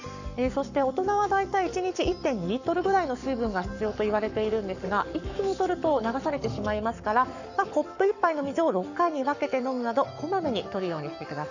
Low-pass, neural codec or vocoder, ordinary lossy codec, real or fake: 7.2 kHz; codec, 44.1 kHz, 7.8 kbps, Pupu-Codec; none; fake